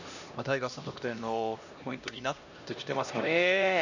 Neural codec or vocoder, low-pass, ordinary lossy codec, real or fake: codec, 16 kHz, 1 kbps, X-Codec, HuBERT features, trained on LibriSpeech; 7.2 kHz; none; fake